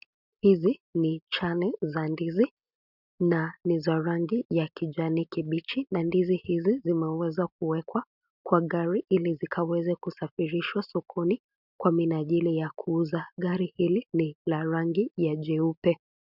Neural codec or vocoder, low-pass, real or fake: none; 5.4 kHz; real